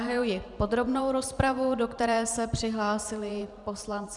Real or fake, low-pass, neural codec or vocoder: fake; 10.8 kHz; vocoder, 44.1 kHz, 128 mel bands every 512 samples, BigVGAN v2